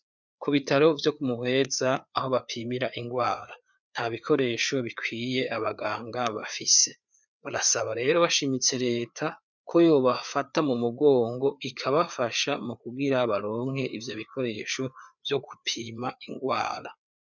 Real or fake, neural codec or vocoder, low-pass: fake; codec, 16 kHz, 4 kbps, FreqCodec, larger model; 7.2 kHz